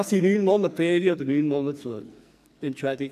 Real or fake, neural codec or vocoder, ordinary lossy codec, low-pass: fake; codec, 44.1 kHz, 2.6 kbps, SNAC; none; 14.4 kHz